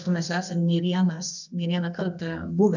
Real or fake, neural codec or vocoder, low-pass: fake; codec, 24 kHz, 0.9 kbps, WavTokenizer, medium music audio release; 7.2 kHz